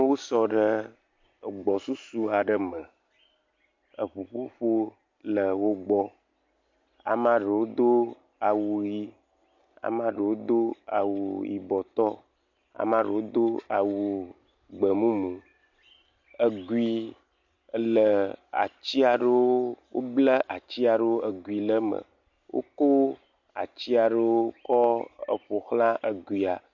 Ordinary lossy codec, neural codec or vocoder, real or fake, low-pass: MP3, 48 kbps; none; real; 7.2 kHz